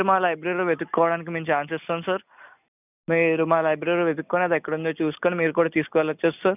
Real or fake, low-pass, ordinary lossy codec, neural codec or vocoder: real; 3.6 kHz; none; none